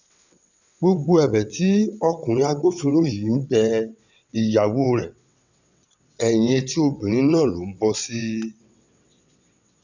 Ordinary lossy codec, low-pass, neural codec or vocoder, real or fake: none; 7.2 kHz; vocoder, 22.05 kHz, 80 mel bands, WaveNeXt; fake